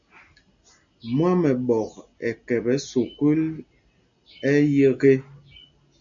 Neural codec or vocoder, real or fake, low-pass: none; real; 7.2 kHz